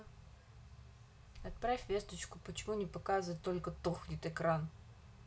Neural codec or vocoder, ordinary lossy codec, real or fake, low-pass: none; none; real; none